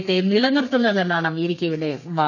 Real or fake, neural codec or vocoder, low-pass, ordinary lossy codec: fake; codec, 32 kHz, 1.9 kbps, SNAC; 7.2 kHz; none